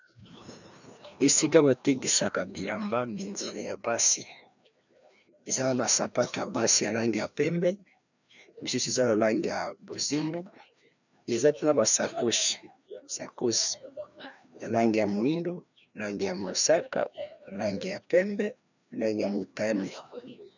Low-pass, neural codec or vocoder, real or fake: 7.2 kHz; codec, 16 kHz, 1 kbps, FreqCodec, larger model; fake